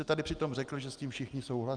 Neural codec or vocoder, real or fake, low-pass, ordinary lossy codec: none; real; 9.9 kHz; Opus, 32 kbps